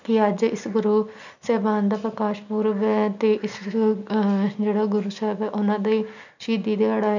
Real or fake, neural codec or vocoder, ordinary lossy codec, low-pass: real; none; none; 7.2 kHz